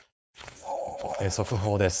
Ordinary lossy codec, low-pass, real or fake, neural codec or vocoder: none; none; fake; codec, 16 kHz, 4.8 kbps, FACodec